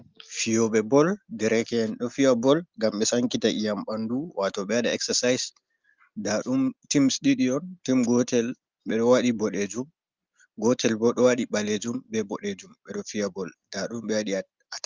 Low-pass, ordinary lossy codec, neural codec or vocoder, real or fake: 7.2 kHz; Opus, 24 kbps; none; real